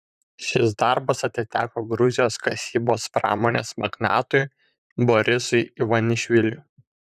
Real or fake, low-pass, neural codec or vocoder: fake; 14.4 kHz; vocoder, 44.1 kHz, 128 mel bands, Pupu-Vocoder